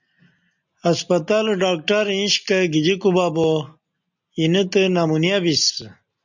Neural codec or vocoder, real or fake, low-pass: none; real; 7.2 kHz